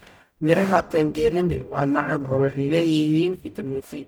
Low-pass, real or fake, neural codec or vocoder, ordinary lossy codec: none; fake; codec, 44.1 kHz, 0.9 kbps, DAC; none